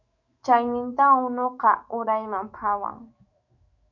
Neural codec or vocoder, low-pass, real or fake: autoencoder, 48 kHz, 128 numbers a frame, DAC-VAE, trained on Japanese speech; 7.2 kHz; fake